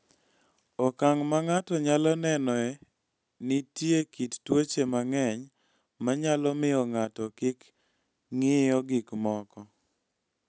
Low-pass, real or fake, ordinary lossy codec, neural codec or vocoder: none; real; none; none